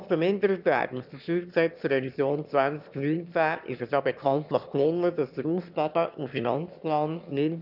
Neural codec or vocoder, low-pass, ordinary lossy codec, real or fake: autoencoder, 22.05 kHz, a latent of 192 numbers a frame, VITS, trained on one speaker; 5.4 kHz; none; fake